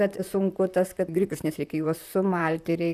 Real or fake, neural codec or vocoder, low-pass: fake; vocoder, 44.1 kHz, 128 mel bands, Pupu-Vocoder; 14.4 kHz